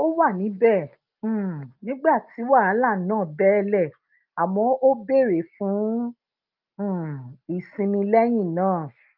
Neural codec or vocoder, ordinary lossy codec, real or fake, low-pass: none; Opus, 24 kbps; real; 5.4 kHz